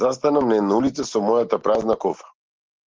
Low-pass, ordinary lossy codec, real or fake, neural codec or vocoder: 7.2 kHz; Opus, 16 kbps; real; none